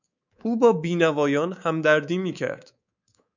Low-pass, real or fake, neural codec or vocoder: 7.2 kHz; fake; codec, 24 kHz, 3.1 kbps, DualCodec